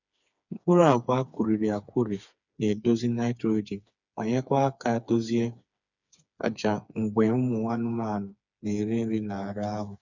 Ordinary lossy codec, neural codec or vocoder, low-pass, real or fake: none; codec, 16 kHz, 4 kbps, FreqCodec, smaller model; 7.2 kHz; fake